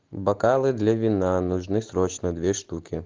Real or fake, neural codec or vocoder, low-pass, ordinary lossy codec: real; none; 7.2 kHz; Opus, 16 kbps